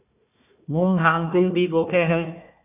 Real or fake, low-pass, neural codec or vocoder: fake; 3.6 kHz; codec, 16 kHz, 1 kbps, FunCodec, trained on Chinese and English, 50 frames a second